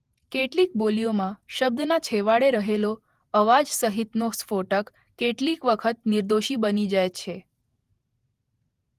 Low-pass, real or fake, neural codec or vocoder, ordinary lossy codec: 14.4 kHz; fake; vocoder, 48 kHz, 128 mel bands, Vocos; Opus, 24 kbps